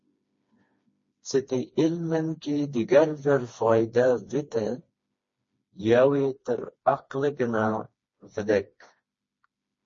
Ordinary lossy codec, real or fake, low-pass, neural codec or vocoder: MP3, 32 kbps; fake; 7.2 kHz; codec, 16 kHz, 2 kbps, FreqCodec, smaller model